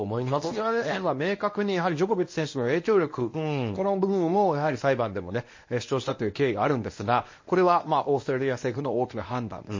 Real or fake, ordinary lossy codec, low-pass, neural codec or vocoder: fake; MP3, 32 kbps; 7.2 kHz; codec, 24 kHz, 0.9 kbps, WavTokenizer, medium speech release version 1